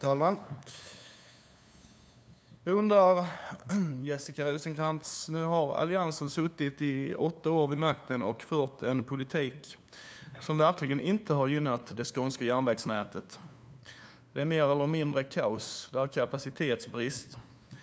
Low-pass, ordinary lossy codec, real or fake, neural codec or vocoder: none; none; fake; codec, 16 kHz, 2 kbps, FunCodec, trained on LibriTTS, 25 frames a second